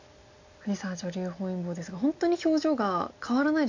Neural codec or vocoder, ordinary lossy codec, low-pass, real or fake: none; AAC, 48 kbps; 7.2 kHz; real